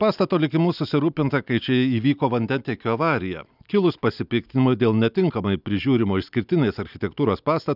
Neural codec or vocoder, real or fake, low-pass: none; real; 5.4 kHz